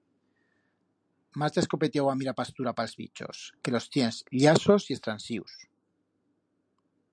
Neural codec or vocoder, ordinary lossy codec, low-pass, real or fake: none; MP3, 96 kbps; 9.9 kHz; real